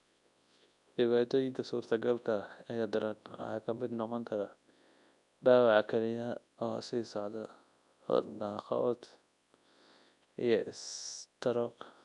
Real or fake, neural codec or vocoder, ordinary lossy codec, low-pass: fake; codec, 24 kHz, 0.9 kbps, WavTokenizer, large speech release; none; 10.8 kHz